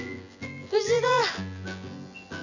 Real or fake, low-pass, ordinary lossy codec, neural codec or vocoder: fake; 7.2 kHz; none; vocoder, 24 kHz, 100 mel bands, Vocos